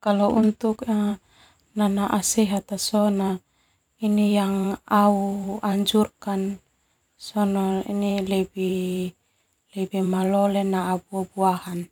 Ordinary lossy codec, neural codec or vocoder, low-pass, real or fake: none; vocoder, 44.1 kHz, 128 mel bands every 512 samples, BigVGAN v2; 19.8 kHz; fake